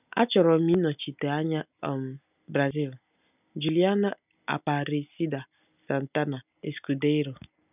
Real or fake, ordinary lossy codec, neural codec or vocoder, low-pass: real; none; none; 3.6 kHz